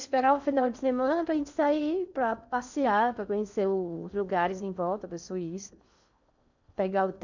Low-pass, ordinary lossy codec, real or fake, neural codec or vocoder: 7.2 kHz; none; fake; codec, 16 kHz in and 24 kHz out, 0.6 kbps, FocalCodec, streaming, 4096 codes